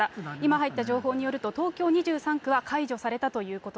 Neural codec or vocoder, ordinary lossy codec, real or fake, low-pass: none; none; real; none